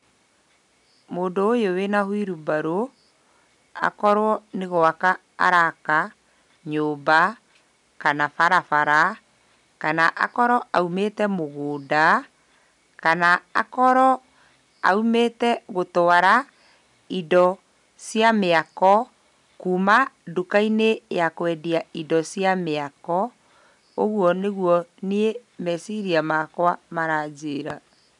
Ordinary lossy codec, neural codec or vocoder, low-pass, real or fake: none; none; 10.8 kHz; real